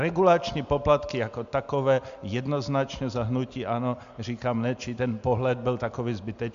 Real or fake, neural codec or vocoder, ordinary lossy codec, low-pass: real; none; MP3, 64 kbps; 7.2 kHz